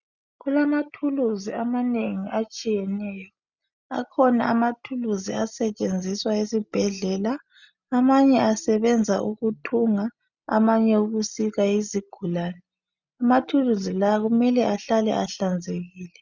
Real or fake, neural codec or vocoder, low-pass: real; none; 7.2 kHz